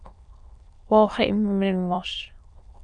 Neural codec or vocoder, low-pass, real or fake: autoencoder, 22.05 kHz, a latent of 192 numbers a frame, VITS, trained on many speakers; 9.9 kHz; fake